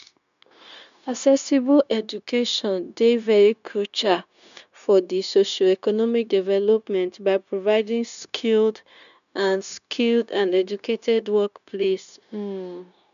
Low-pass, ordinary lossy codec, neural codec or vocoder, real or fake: 7.2 kHz; none; codec, 16 kHz, 0.9 kbps, LongCat-Audio-Codec; fake